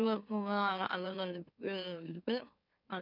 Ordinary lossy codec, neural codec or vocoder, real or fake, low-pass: none; autoencoder, 44.1 kHz, a latent of 192 numbers a frame, MeloTTS; fake; 5.4 kHz